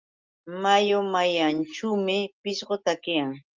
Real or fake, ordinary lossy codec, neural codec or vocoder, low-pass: real; Opus, 24 kbps; none; 7.2 kHz